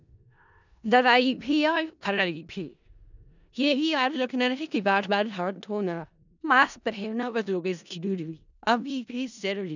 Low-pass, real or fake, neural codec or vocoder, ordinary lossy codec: 7.2 kHz; fake; codec, 16 kHz in and 24 kHz out, 0.4 kbps, LongCat-Audio-Codec, four codebook decoder; none